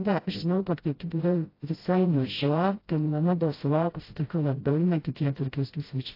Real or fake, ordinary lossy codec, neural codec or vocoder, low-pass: fake; AAC, 32 kbps; codec, 16 kHz, 0.5 kbps, FreqCodec, smaller model; 5.4 kHz